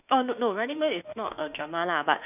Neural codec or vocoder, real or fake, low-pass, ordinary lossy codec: autoencoder, 48 kHz, 32 numbers a frame, DAC-VAE, trained on Japanese speech; fake; 3.6 kHz; none